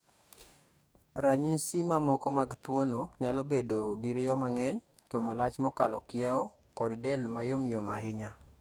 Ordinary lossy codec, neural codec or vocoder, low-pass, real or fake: none; codec, 44.1 kHz, 2.6 kbps, DAC; none; fake